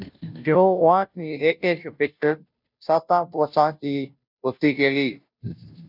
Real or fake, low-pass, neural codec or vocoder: fake; 5.4 kHz; codec, 16 kHz, 0.5 kbps, FunCodec, trained on Chinese and English, 25 frames a second